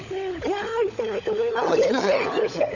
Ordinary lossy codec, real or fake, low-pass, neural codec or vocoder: none; fake; 7.2 kHz; codec, 16 kHz, 16 kbps, FunCodec, trained on LibriTTS, 50 frames a second